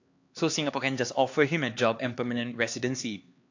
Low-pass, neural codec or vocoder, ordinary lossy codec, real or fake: 7.2 kHz; codec, 16 kHz, 2 kbps, X-Codec, HuBERT features, trained on LibriSpeech; AAC, 48 kbps; fake